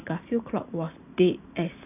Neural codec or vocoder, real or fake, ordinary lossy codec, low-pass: vocoder, 44.1 kHz, 128 mel bands every 512 samples, BigVGAN v2; fake; none; 3.6 kHz